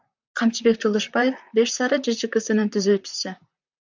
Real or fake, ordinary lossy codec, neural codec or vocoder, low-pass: fake; MP3, 64 kbps; vocoder, 44.1 kHz, 128 mel bands, Pupu-Vocoder; 7.2 kHz